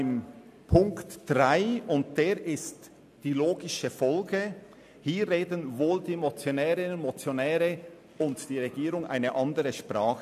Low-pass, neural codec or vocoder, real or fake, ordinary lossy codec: 14.4 kHz; none; real; AAC, 96 kbps